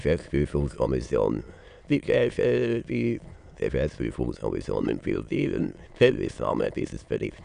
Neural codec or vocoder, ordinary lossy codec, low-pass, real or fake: autoencoder, 22.05 kHz, a latent of 192 numbers a frame, VITS, trained on many speakers; none; 9.9 kHz; fake